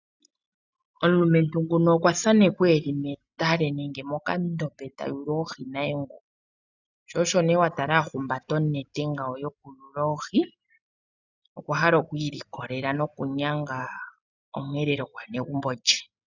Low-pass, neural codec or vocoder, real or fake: 7.2 kHz; none; real